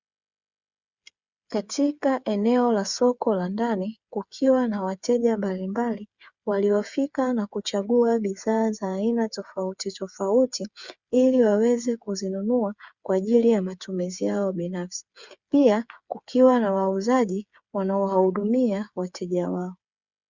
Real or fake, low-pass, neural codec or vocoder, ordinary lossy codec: fake; 7.2 kHz; codec, 16 kHz, 8 kbps, FreqCodec, smaller model; Opus, 64 kbps